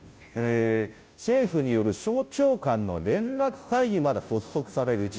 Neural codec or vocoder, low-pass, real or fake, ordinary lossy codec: codec, 16 kHz, 0.5 kbps, FunCodec, trained on Chinese and English, 25 frames a second; none; fake; none